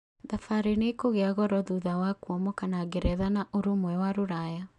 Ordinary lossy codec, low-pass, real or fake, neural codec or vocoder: MP3, 96 kbps; 10.8 kHz; real; none